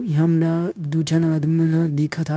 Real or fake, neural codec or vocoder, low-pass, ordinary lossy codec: fake; codec, 16 kHz, 0.9 kbps, LongCat-Audio-Codec; none; none